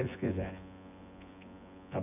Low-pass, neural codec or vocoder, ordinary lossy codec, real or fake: 3.6 kHz; vocoder, 24 kHz, 100 mel bands, Vocos; none; fake